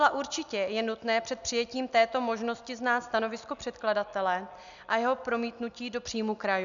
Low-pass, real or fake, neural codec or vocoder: 7.2 kHz; real; none